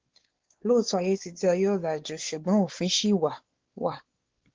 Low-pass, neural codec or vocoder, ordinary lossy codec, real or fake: 7.2 kHz; codec, 16 kHz, 4 kbps, X-Codec, WavLM features, trained on Multilingual LibriSpeech; Opus, 16 kbps; fake